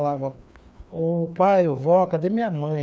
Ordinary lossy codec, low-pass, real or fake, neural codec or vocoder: none; none; fake; codec, 16 kHz, 2 kbps, FreqCodec, larger model